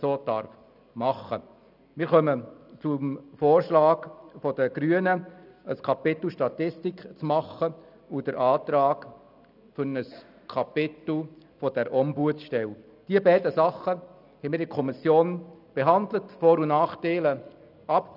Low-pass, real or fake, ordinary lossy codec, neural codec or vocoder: 5.4 kHz; real; none; none